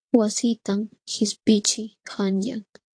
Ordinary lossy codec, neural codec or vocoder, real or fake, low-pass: AAC, 48 kbps; vocoder, 22.05 kHz, 80 mel bands, WaveNeXt; fake; 9.9 kHz